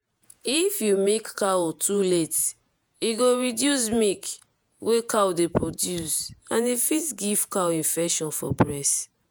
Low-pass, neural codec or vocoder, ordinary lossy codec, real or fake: none; vocoder, 48 kHz, 128 mel bands, Vocos; none; fake